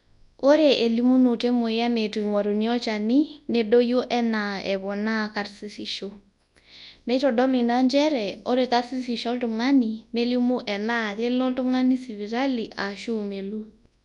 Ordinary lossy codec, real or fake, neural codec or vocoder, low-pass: none; fake; codec, 24 kHz, 0.9 kbps, WavTokenizer, large speech release; 10.8 kHz